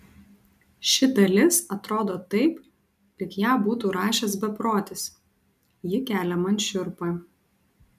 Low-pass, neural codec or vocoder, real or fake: 14.4 kHz; none; real